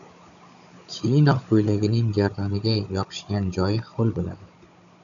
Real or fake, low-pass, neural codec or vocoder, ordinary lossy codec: fake; 7.2 kHz; codec, 16 kHz, 16 kbps, FunCodec, trained on Chinese and English, 50 frames a second; MP3, 96 kbps